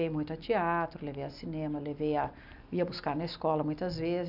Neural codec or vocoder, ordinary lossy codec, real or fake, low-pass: none; none; real; 5.4 kHz